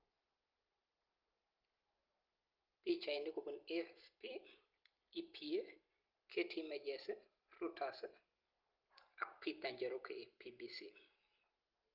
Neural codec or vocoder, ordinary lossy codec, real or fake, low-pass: none; Opus, 32 kbps; real; 5.4 kHz